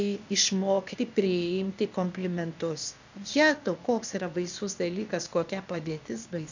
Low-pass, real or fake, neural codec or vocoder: 7.2 kHz; fake; codec, 16 kHz, 0.8 kbps, ZipCodec